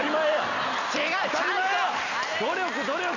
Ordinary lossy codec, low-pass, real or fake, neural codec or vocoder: none; 7.2 kHz; real; none